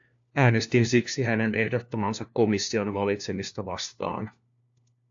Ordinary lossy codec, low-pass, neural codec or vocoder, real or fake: AAC, 64 kbps; 7.2 kHz; codec, 16 kHz, 1 kbps, FunCodec, trained on LibriTTS, 50 frames a second; fake